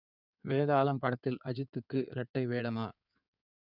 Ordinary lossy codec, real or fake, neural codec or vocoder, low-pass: none; fake; codec, 16 kHz in and 24 kHz out, 2.2 kbps, FireRedTTS-2 codec; 5.4 kHz